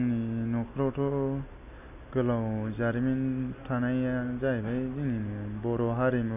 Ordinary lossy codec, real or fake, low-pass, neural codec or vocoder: none; real; 3.6 kHz; none